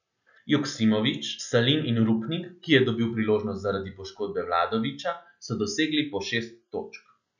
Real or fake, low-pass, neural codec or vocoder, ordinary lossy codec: real; 7.2 kHz; none; none